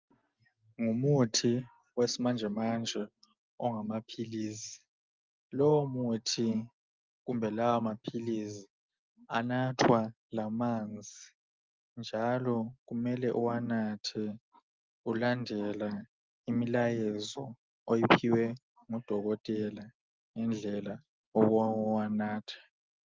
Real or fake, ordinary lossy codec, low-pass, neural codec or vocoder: real; Opus, 32 kbps; 7.2 kHz; none